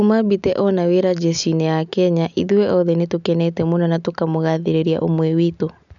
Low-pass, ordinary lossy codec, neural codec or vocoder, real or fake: 7.2 kHz; none; none; real